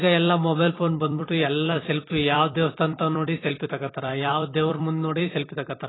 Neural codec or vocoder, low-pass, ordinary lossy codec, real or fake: vocoder, 44.1 kHz, 128 mel bands every 256 samples, BigVGAN v2; 7.2 kHz; AAC, 16 kbps; fake